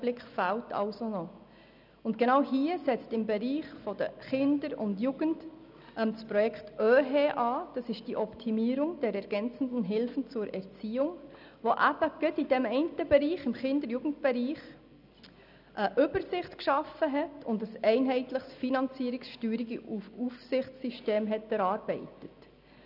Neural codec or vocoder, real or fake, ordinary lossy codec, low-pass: none; real; none; 5.4 kHz